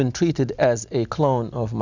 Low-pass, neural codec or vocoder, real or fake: 7.2 kHz; none; real